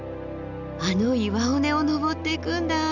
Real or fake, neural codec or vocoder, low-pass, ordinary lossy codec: real; none; 7.2 kHz; none